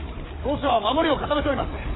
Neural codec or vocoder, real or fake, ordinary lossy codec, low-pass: codec, 16 kHz, 8 kbps, FreqCodec, larger model; fake; AAC, 16 kbps; 7.2 kHz